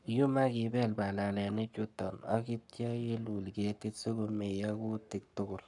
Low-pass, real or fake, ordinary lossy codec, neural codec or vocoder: 10.8 kHz; fake; none; codec, 44.1 kHz, 7.8 kbps, Pupu-Codec